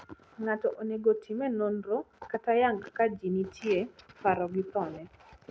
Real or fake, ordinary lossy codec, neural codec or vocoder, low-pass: real; none; none; none